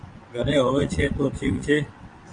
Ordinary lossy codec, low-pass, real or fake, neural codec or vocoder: MP3, 48 kbps; 9.9 kHz; fake; vocoder, 22.05 kHz, 80 mel bands, WaveNeXt